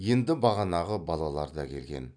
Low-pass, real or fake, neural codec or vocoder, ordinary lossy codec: 9.9 kHz; real; none; none